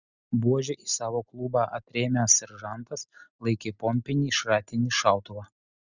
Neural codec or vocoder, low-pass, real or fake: none; 7.2 kHz; real